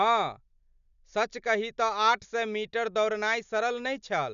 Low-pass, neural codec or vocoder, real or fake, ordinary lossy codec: 7.2 kHz; none; real; none